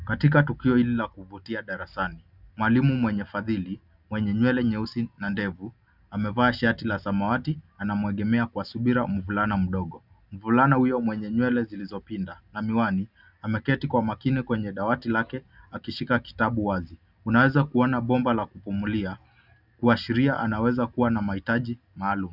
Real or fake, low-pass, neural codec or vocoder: real; 5.4 kHz; none